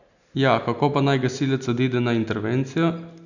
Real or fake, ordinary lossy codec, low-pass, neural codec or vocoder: real; none; 7.2 kHz; none